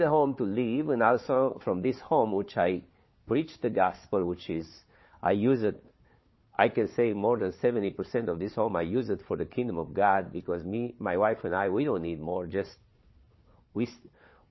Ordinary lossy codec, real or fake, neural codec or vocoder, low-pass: MP3, 24 kbps; fake; codec, 16 kHz, 4 kbps, FunCodec, trained on Chinese and English, 50 frames a second; 7.2 kHz